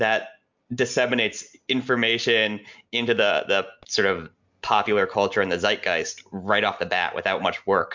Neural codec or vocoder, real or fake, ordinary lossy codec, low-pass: none; real; MP3, 64 kbps; 7.2 kHz